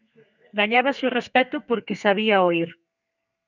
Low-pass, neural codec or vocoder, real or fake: 7.2 kHz; codec, 32 kHz, 1.9 kbps, SNAC; fake